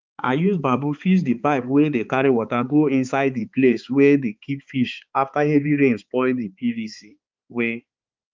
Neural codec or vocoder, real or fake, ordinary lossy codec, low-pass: codec, 16 kHz, 4 kbps, X-Codec, HuBERT features, trained on balanced general audio; fake; Opus, 24 kbps; 7.2 kHz